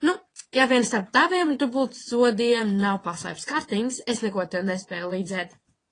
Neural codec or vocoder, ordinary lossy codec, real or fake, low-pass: vocoder, 22.05 kHz, 80 mel bands, WaveNeXt; AAC, 32 kbps; fake; 9.9 kHz